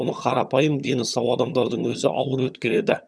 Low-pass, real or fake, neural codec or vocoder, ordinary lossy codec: none; fake; vocoder, 22.05 kHz, 80 mel bands, HiFi-GAN; none